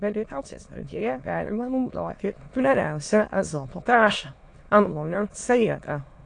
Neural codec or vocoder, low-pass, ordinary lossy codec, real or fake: autoencoder, 22.05 kHz, a latent of 192 numbers a frame, VITS, trained on many speakers; 9.9 kHz; AAC, 48 kbps; fake